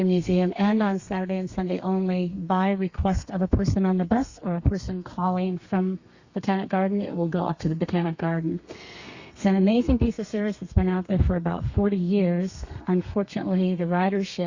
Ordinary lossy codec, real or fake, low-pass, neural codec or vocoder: Opus, 64 kbps; fake; 7.2 kHz; codec, 32 kHz, 1.9 kbps, SNAC